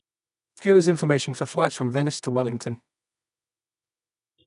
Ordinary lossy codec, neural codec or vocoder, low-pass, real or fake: none; codec, 24 kHz, 0.9 kbps, WavTokenizer, medium music audio release; 10.8 kHz; fake